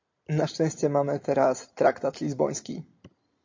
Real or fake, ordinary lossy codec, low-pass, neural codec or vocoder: real; MP3, 48 kbps; 7.2 kHz; none